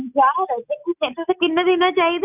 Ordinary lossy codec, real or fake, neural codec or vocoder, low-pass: none; real; none; 3.6 kHz